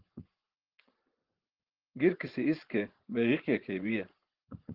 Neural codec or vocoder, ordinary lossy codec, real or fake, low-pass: vocoder, 24 kHz, 100 mel bands, Vocos; Opus, 16 kbps; fake; 5.4 kHz